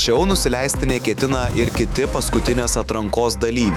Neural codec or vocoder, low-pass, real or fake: autoencoder, 48 kHz, 128 numbers a frame, DAC-VAE, trained on Japanese speech; 19.8 kHz; fake